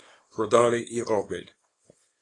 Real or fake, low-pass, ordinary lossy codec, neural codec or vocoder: fake; 10.8 kHz; AAC, 32 kbps; codec, 24 kHz, 0.9 kbps, WavTokenizer, small release